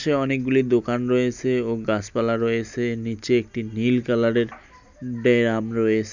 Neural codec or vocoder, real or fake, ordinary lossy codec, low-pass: none; real; none; 7.2 kHz